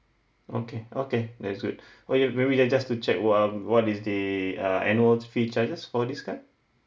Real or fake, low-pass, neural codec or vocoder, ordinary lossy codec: real; none; none; none